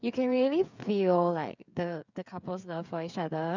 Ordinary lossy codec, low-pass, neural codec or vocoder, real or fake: none; 7.2 kHz; codec, 16 kHz, 8 kbps, FreqCodec, smaller model; fake